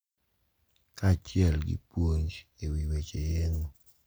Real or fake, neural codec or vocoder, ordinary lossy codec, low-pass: real; none; none; none